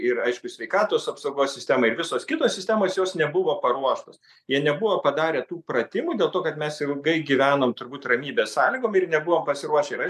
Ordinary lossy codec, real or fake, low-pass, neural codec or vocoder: MP3, 96 kbps; real; 14.4 kHz; none